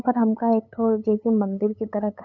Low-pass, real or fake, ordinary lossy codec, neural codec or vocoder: 7.2 kHz; fake; none; codec, 16 kHz, 8 kbps, FunCodec, trained on LibriTTS, 25 frames a second